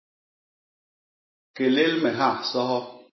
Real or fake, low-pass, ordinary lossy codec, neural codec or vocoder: real; 7.2 kHz; MP3, 24 kbps; none